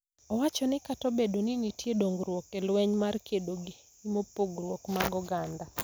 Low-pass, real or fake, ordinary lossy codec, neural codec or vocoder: none; real; none; none